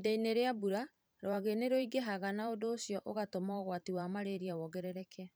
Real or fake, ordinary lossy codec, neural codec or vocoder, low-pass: fake; none; vocoder, 44.1 kHz, 128 mel bands every 512 samples, BigVGAN v2; none